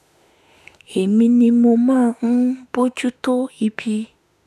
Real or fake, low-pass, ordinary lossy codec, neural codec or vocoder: fake; 14.4 kHz; none; autoencoder, 48 kHz, 32 numbers a frame, DAC-VAE, trained on Japanese speech